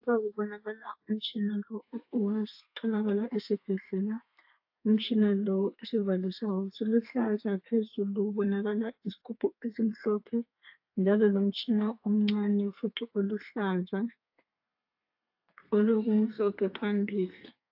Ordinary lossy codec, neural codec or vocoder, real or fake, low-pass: AAC, 48 kbps; codec, 32 kHz, 1.9 kbps, SNAC; fake; 5.4 kHz